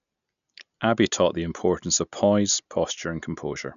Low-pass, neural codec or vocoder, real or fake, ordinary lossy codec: 7.2 kHz; none; real; none